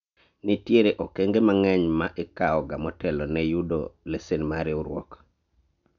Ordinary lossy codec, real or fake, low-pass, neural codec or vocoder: none; real; 7.2 kHz; none